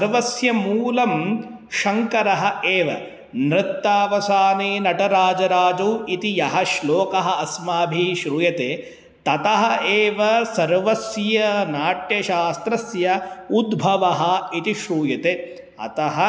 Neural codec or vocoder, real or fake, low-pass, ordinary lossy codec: none; real; none; none